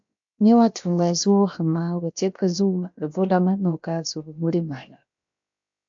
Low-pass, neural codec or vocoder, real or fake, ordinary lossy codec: 7.2 kHz; codec, 16 kHz, about 1 kbps, DyCAST, with the encoder's durations; fake; AAC, 64 kbps